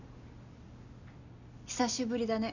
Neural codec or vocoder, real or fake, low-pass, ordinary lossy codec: none; real; 7.2 kHz; none